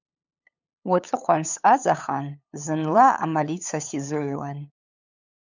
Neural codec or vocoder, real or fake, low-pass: codec, 16 kHz, 8 kbps, FunCodec, trained on LibriTTS, 25 frames a second; fake; 7.2 kHz